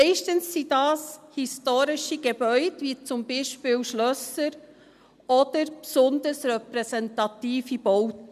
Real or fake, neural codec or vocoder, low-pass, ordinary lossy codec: real; none; 14.4 kHz; none